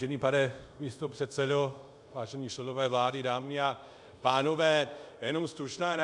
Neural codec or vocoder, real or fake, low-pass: codec, 24 kHz, 0.5 kbps, DualCodec; fake; 10.8 kHz